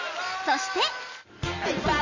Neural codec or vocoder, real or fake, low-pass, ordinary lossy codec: none; real; 7.2 kHz; MP3, 32 kbps